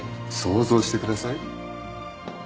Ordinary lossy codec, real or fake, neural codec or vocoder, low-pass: none; real; none; none